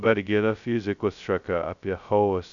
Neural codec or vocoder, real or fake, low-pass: codec, 16 kHz, 0.2 kbps, FocalCodec; fake; 7.2 kHz